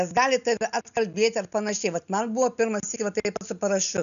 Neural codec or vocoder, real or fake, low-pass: none; real; 7.2 kHz